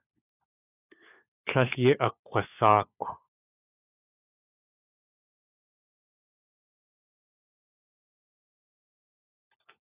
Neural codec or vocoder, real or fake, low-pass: codec, 16 kHz, 4 kbps, FunCodec, trained on LibriTTS, 50 frames a second; fake; 3.6 kHz